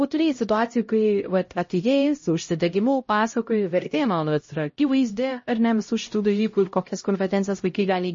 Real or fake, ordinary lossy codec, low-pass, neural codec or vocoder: fake; MP3, 32 kbps; 7.2 kHz; codec, 16 kHz, 0.5 kbps, X-Codec, HuBERT features, trained on LibriSpeech